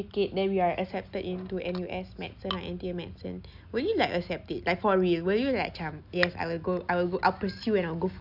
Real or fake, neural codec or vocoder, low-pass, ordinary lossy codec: real; none; 5.4 kHz; none